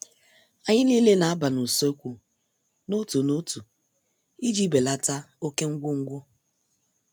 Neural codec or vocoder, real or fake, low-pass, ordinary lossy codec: none; real; none; none